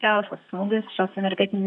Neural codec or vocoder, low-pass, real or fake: codec, 32 kHz, 1.9 kbps, SNAC; 10.8 kHz; fake